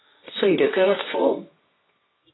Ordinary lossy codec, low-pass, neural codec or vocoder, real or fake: AAC, 16 kbps; 7.2 kHz; codec, 24 kHz, 0.9 kbps, WavTokenizer, medium music audio release; fake